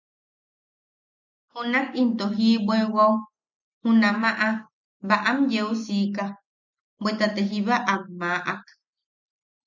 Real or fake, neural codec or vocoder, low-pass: real; none; 7.2 kHz